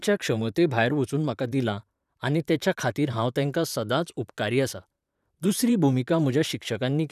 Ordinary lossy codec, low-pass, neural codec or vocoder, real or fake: none; 14.4 kHz; vocoder, 44.1 kHz, 128 mel bands, Pupu-Vocoder; fake